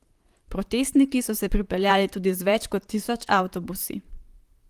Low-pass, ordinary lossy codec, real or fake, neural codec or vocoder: 14.4 kHz; Opus, 24 kbps; fake; vocoder, 44.1 kHz, 128 mel bands, Pupu-Vocoder